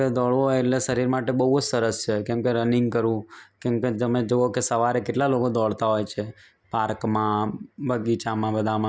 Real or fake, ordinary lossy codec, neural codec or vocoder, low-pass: real; none; none; none